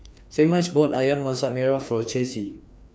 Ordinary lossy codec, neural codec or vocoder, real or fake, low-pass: none; codec, 16 kHz, 2 kbps, FreqCodec, larger model; fake; none